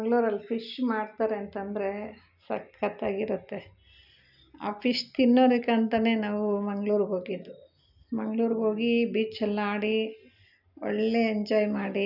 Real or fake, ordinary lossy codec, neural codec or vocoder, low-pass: real; none; none; 5.4 kHz